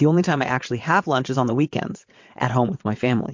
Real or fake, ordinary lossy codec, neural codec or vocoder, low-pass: fake; MP3, 48 kbps; vocoder, 22.05 kHz, 80 mel bands, WaveNeXt; 7.2 kHz